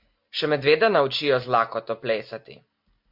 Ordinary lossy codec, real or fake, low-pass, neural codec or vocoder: MP3, 48 kbps; real; 5.4 kHz; none